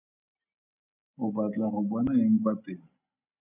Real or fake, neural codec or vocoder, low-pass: real; none; 3.6 kHz